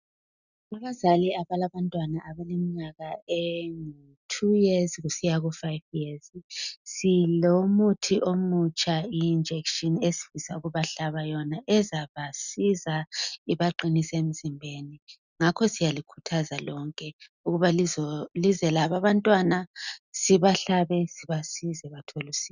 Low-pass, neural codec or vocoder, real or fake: 7.2 kHz; none; real